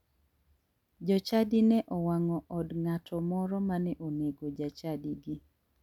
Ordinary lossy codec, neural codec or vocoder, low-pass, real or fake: none; none; 19.8 kHz; real